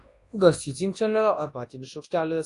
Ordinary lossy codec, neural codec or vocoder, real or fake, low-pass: AAC, 48 kbps; codec, 24 kHz, 0.9 kbps, WavTokenizer, large speech release; fake; 10.8 kHz